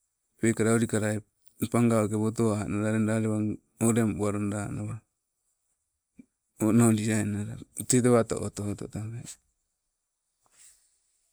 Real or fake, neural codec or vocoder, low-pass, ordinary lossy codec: real; none; none; none